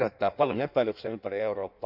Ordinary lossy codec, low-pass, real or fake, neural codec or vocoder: none; 5.4 kHz; fake; codec, 16 kHz in and 24 kHz out, 1.1 kbps, FireRedTTS-2 codec